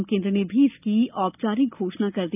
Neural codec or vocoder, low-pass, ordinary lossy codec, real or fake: none; 3.6 kHz; none; real